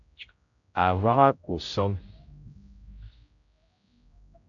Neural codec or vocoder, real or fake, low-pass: codec, 16 kHz, 0.5 kbps, X-Codec, HuBERT features, trained on general audio; fake; 7.2 kHz